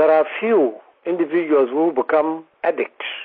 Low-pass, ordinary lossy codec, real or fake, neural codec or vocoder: 5.4 kHz; MP3, 32 kbps; real; none